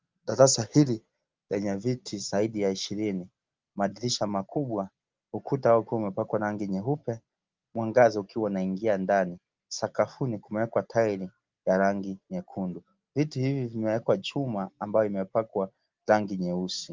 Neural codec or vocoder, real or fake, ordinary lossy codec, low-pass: none; real; Opus, 32 kbps; 7.2 kHz